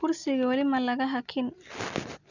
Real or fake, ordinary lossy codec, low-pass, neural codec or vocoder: real; none; 7.2 kHz; none